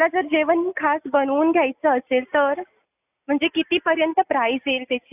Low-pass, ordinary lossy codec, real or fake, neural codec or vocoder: 3.6 kHz; AAC, 32 kbps; real; none